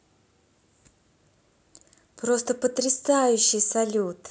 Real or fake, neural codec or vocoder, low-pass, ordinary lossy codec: real; none; none; none